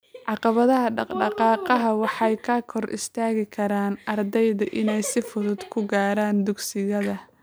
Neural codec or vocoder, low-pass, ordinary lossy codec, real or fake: none; none; none; real